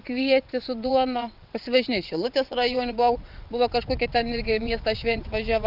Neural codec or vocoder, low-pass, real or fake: vocoder, 22.05 kHz, 80 mel bands, WaveNeXt; 5.4 kHz; fake